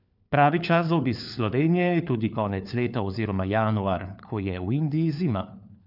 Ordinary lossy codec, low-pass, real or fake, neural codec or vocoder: none; 5.4 kHz; fake; codec, 16 kHz, 4 kbps, FunCodec, trained on LibriTTS, 50 frames a second